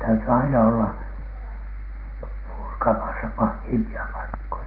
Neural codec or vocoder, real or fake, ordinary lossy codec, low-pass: none; real; Opus, 16 kbps; 5.4 kHz